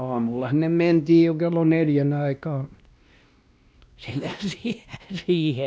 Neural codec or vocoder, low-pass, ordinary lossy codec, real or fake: codec, 16 kHz, 1 kbps, X-Codec, WavLM features, trained on Multilingual LibriSpeech; none; none; fake